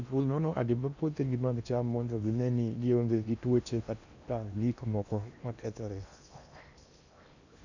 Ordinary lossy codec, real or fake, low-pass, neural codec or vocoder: none; fake; 7.2 kHz; codec, 16 kHz in and 24 kHz out, 0.8 kbps, FocalCodec, streaming, 65536 codes